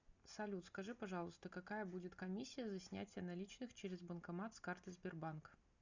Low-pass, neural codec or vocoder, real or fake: 7.2 kHz; none; real